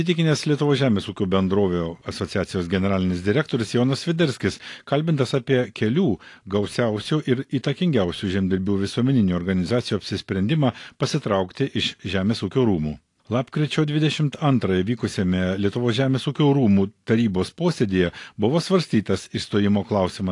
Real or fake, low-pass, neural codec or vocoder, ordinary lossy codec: real; 10.8 kHz; none; AAC, 48 kbps